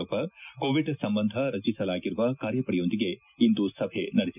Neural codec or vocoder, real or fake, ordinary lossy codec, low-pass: none; real; none; 3.6 kHz